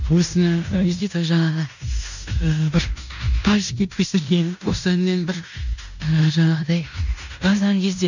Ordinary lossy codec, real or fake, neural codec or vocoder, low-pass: none; fake; codec, 16 kHz in and 24 kHz out, 0.9 kbps, LongCat-Audio-Codec, fine tuned four codebook decoder; 7.2 kHz